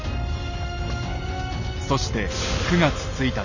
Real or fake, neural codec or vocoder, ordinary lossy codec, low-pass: real; none; none; 7.2 kHz